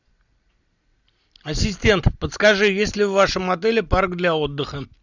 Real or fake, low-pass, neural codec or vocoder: real; 7.2 kHz; none